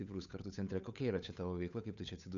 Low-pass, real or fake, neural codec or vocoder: 7.2 kHz; fake; codec, 16 kHz, 8 kbps, FunCodec, trained on Chinese and English, 25 frames a second